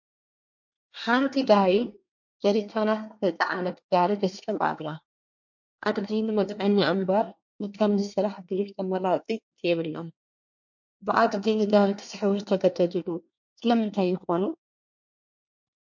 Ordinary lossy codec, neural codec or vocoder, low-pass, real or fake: MP3, 48 kbps; codec, 24 kHz, 1 kbps, SNAC; 7.2 kHz; fake